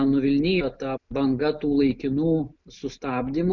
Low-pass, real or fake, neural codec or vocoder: 7.2 kHz; real; none